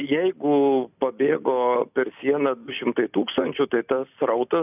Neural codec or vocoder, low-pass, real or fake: none; 3.6 kHz; real